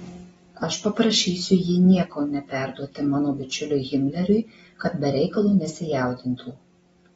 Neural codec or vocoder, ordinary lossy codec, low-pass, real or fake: none; AAC, 24 kbps; 19.8 kHz; real